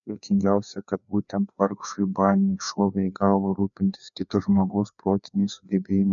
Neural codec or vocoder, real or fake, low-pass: codec, 16 kHz, 2 kbps, FreqCodec, larger model; fake; 7.2 kHz